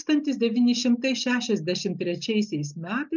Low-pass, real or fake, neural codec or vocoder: 7.2 kHz; real; none